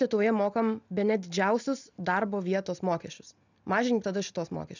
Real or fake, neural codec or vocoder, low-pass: real; none; 7.2 kHz